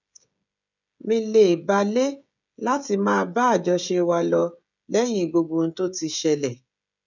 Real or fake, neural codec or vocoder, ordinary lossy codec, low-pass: fake; codec, 16 kHz, 16 kbps, FreqCodec, smaller model; none; 7.2 kHz